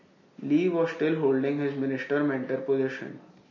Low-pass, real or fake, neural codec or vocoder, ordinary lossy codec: 7.2 kHz; real; none; MP3, 32 kbps